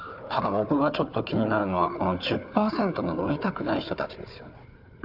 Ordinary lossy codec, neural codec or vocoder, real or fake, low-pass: none; codec, 16 kHz, 4 kbps, FunCodec, trained on Chinese and English, 50 frames a second; fake; 5.4 kHz